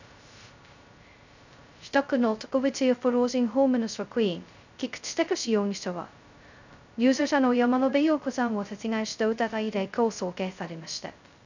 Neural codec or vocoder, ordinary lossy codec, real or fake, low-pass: codec, 16 kHz, 0.2 kbps, FocalCodec; none; fake; 7.2 kHz